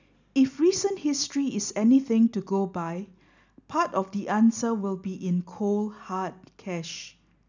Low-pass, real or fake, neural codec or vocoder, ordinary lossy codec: 7.2 kHz; real; none; none